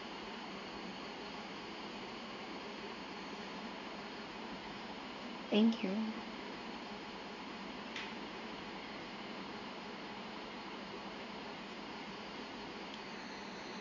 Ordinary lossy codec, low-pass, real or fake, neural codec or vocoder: none; 7.2 kHz; fake; codec, 16 kHz in and 24 kHz out, 1 kbps, XY-Tokenizer